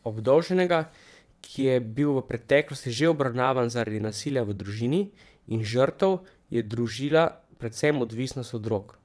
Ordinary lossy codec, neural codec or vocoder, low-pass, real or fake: none; vocoder, 22.05 kHz, 80 mel bands, WaveNeXt; none; fake